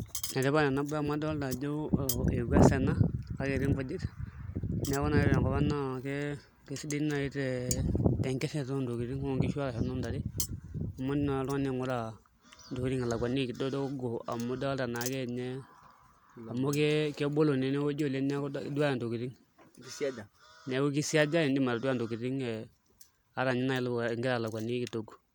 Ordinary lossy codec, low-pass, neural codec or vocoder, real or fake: none; none; none; real